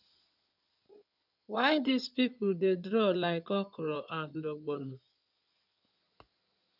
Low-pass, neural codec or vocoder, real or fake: 5.4 kHz; codec, 16 kHz in and 24 kHz out, 2.2 kbps, FireRedTTS-2 codec; fake